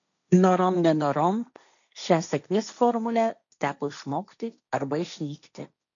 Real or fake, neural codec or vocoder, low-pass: fake; codec, 16 kHz, 1.1 kbps, Voila-Tokenizer; 7.2 kHz